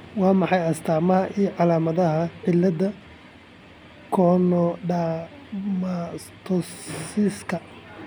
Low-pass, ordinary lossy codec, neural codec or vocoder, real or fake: none; none; none; real